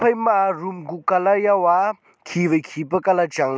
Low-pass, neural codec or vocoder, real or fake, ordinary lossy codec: none; none; real; none